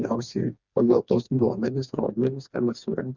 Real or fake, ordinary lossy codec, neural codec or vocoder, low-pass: fake; Opus, 64 kbps; codec, 16 kHz, 2 kbps, FreqCodec, smaller model; 7.2 kHz